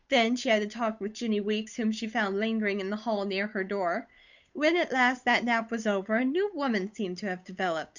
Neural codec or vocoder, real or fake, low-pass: codec, 16 kHz, 8 kbps, FunCodec, trained on Chinese and English, 25 frames a second; fake; 7.2 kHz